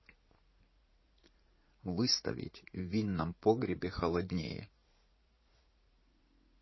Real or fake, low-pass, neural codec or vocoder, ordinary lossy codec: fake; 7.2 kHz; vocoder, 44.1 kHz, 80 mel bands, Vocos; MP3, 24 kbps